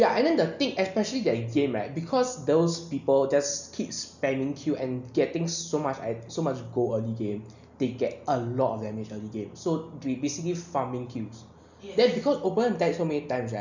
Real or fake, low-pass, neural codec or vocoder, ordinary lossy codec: real; 7.2 kHz; none; none